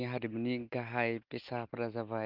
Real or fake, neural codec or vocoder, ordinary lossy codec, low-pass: real; none; Opus, 32 kbps; 5.4 kHz